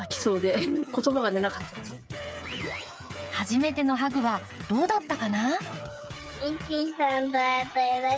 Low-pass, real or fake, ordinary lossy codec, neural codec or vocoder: none; fake; none; codec, 16 kHz, 8 kbps, FreqCodec, smaller model